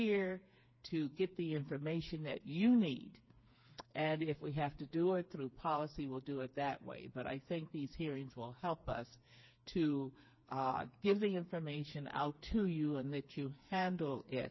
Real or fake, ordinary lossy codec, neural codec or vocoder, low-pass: fake; MP3, 24 kbps; codec, 16 kHz, 4 kbps, FreqCodec, smaller model; 7.2 kHz